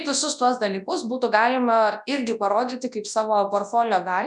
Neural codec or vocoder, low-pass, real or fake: codec, 24 kHz, 0.9 kbps, WavTokenizer, large speech release; 10.8 kHz; fake